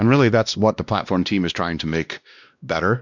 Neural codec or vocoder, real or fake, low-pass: codec, 16 kHz, 1 kbps, X-Codec, WavLM features, trained on Multilingual LibriSpeech; fake; 7.2 kHz